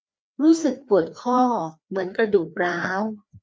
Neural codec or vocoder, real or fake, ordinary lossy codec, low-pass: codec, 16 kHz, 2 kbps, FreqCodec, larger model; fake; none; none